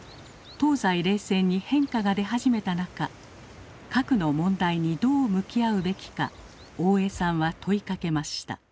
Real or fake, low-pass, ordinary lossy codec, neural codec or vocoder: real; none; none; none